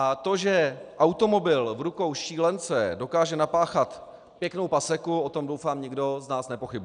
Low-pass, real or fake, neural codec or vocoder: 9.9 kHz; real; none